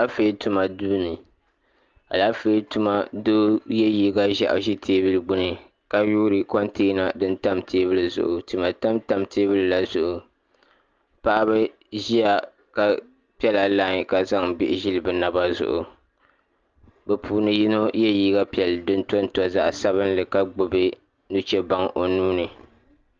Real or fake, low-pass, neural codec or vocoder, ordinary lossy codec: real; 7.2 kHz; none; Opus, 24 kbps